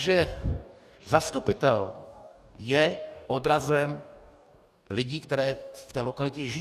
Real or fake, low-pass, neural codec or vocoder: fake; 14.4 kHz; codec, 44.1 kHz, 2.6 kbps, DAC